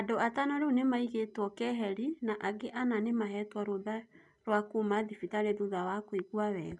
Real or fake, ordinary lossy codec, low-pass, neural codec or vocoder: real; none; 10.8 kHz; none